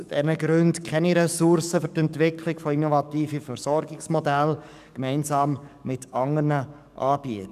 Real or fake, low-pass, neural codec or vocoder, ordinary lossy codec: fake; 14.4 kHz; codec, 44.1 kHz, 7.8 kbps, DAC; none